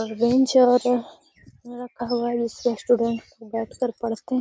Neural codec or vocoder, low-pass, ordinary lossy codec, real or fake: none; none; none; real